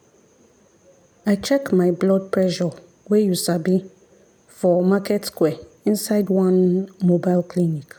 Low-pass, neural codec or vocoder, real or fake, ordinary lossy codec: none; none; real; none